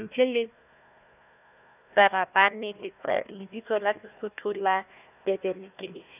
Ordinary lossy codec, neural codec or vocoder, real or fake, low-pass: none; codec, 16 kHz, 1 kbps, FunCodec, trained on Chinese and English, 50 frames a second; fake; 3.6 kHz